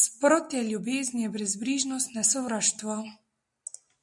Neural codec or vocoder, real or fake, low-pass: none; real; 10.8 kHz